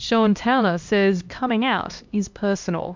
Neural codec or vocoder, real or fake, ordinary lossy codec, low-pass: codec, 16 kHz, 1 kbps, X-Codec, HuBERT features, trained on LibriSpeech; fake; MP3, 64 kbps; 7.2 kHz